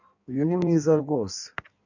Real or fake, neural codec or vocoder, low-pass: fake; codec, 16 kHz in and 24 kHz out, 1.1 kbps, FireRedTTS-2 codec; 7.2 kHz